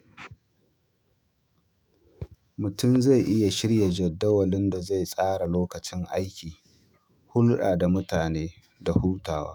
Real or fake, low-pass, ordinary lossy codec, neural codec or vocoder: fake; none; none; autoencoder, 48 kHz, 128 numbers a frame, DAC-VAE, trained on Japanese speech